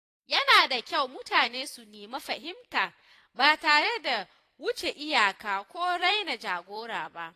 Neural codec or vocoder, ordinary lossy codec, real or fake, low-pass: vocoder, 44.1 kHz, 128 mel bands every 256 samples, BigVGAN v2; AAC, 48 kbps; fake; 14.4 kHz